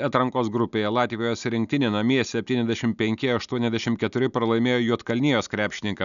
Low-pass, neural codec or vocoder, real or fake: 7.2 kHz; none; real